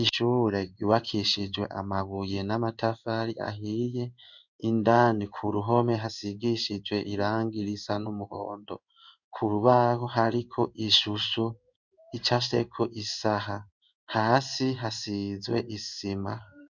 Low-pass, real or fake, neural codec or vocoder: 7.2 kHz; fake; codec, 16 kHz in and 24 kHz out, 1 kbps, XY-Tokenizer